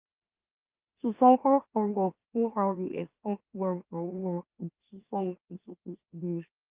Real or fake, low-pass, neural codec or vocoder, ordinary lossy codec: fake; 3.6 kHz; autoencoder, 44.1 kHz, a latent of 192 numbers a frame, MeloTTS; Opus, 24 kbps